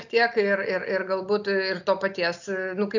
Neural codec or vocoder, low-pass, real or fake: none; 7.2 kHz; real